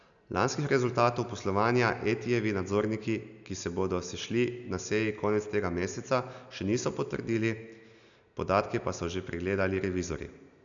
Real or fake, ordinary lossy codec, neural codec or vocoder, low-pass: real; none; none; 7.2 kHz